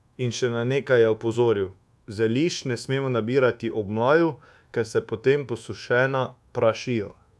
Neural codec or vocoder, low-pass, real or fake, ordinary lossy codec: codec, 24 kHz, 1.2 kbps, DualCodec; none; fake; none